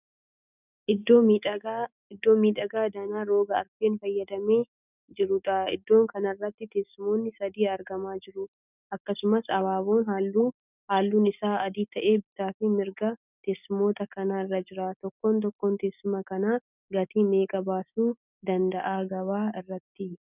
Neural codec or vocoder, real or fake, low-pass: none; real; 3.6 kHz